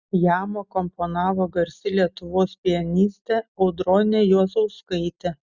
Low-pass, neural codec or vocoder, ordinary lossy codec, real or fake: 7.2 kHz; none; Opus, 64 kbps; real